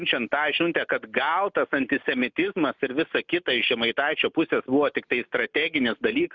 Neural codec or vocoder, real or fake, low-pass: none; real; 7.2 kHz